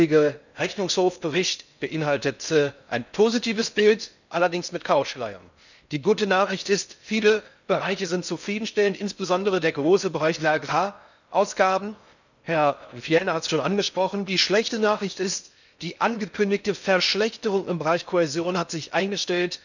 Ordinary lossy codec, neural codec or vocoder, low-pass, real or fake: none; codec, 16 kHz in and 24 kHz out, 0.6 kbps, FocalCodec, streaming, 4096 codes; 7.2 kHz; fake